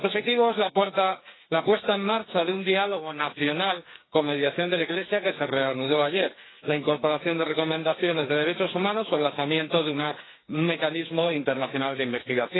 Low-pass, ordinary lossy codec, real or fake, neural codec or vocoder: 7.2 kHz; AAC, 16 kbps; fake; codec, 44.1 kHz, 2.6 kbps, SNAC